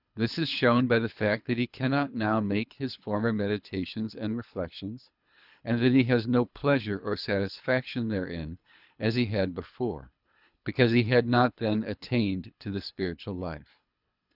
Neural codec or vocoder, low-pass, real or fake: codec, 24 kHz, 3 kbps, HILCodec; 5.4 kHz; fake